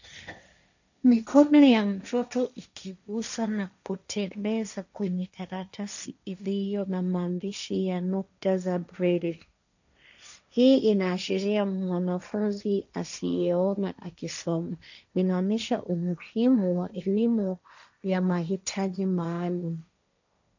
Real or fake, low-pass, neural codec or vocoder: fake; 7.2 kHz; codec, 16 kHz, 1.1 kbps, Voila-Tokenizer